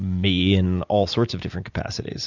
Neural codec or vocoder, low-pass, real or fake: none; 7.2 kHz; real